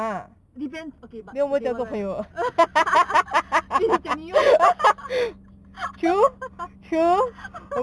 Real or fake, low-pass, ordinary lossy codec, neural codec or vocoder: real; none; none; none